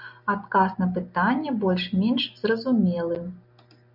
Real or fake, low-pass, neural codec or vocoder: real; 5.4 kHz; none